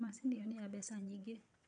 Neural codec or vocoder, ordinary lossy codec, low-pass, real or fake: vocoder, 22.05 kHz, 80 mel bands, WaveNeXt; none; 9.9 kHz; fake